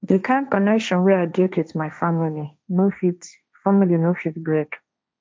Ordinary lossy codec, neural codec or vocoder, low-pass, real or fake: none; codec, 16 kHz, 1.1 kbps, Voila-Tokenizer; none; fake